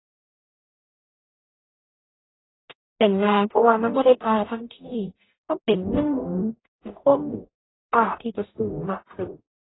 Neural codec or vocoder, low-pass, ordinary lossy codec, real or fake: codec, 44.1 kHz, 0.9 kbps, DAC; 7.2 kHz; AAC, 16 kbps; fake